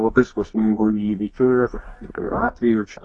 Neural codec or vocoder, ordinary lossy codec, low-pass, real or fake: codec, 24 kHz, 0.9 kbps, WavTokenizer, medium music audio release; AAC, 32 kbps; 10.8 kHz; fake